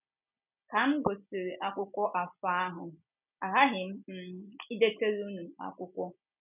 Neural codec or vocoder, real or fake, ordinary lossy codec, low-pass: none; real; none; 3.6 kHz